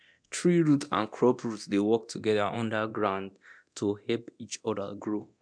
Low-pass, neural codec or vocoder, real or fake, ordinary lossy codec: 9.9 kHz; codec, 24 kHz, 0.9 kbps, DualCodec; fake; none